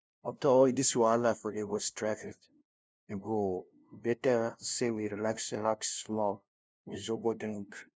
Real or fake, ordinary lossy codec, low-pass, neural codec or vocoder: fake; none; none; codec, 16 kHz, 0.5 kbps, FunCodec, trained on LibriTTS, 25 frames a second